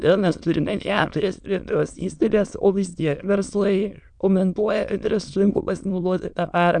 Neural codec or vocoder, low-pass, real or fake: autoencoder, 22.05 kHz, a latent of 192 numbers a frame, VITS, trained on many speakers; 9.9 kHz; fake